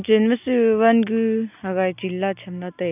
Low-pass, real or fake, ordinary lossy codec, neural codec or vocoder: 3.6 kHz; real; none; none